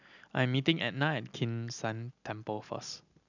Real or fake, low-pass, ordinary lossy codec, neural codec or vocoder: real; 7.2 kHz; none; none